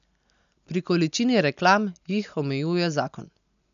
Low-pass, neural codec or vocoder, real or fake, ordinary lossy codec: 7.2 kHz; none; real; none